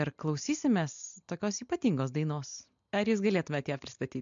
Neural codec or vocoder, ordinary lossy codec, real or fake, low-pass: none; MP3, 64 kbps; real; 7.2 kHz